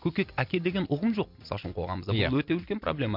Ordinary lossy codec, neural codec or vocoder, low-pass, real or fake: MP3, 48 kbps; none; 5.4 kHz; real